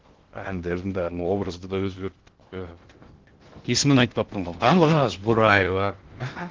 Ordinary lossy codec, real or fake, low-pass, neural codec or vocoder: Opus, 16 kbps; fake; 7.2 kHz; codec, 16 kHz in and 24 kHz out, 0.6 kbps, FocalCodec, streaming, 2048 codes